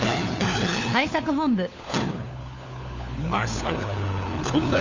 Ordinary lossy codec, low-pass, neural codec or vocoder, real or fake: Opus, 64 kbps; 7.2 kHz; codec, 16 kHz, 4 kbps, FunCodec, trained on LibriTTS, 50 frames a second; fake